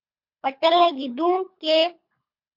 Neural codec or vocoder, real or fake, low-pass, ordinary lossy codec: codec, 24 kHz, 3 kbps, HILCodec; fake; 5.4 kHz; MP3, 48 kbps